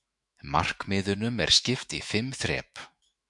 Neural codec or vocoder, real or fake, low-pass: autoencoder, 48 kHz, 128 numbers a frame, DAC-VAE, trained on Japanese speech; fake; 10.8 kHz